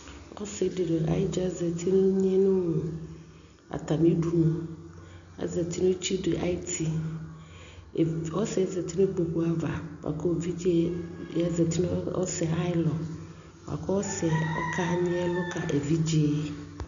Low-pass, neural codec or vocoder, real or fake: 7.2 kHz; none; real